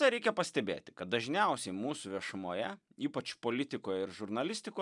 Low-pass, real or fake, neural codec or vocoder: 10.8 kHz; real; none